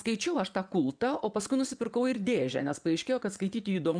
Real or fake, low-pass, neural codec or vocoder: fake; 9.9 kHz; vocoder, 22.05 kHz, 80 mel bands, WaveNeXt